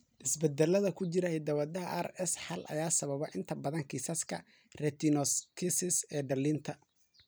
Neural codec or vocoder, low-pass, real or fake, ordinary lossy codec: vocoder, 44.1 kHz, 128 mel bands every 256 samples, BigVGAN v2; none; fake; none